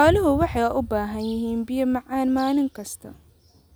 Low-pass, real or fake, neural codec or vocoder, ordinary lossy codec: none; real; none; none